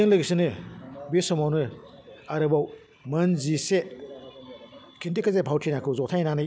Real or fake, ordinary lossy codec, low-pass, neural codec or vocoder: real; none; none; none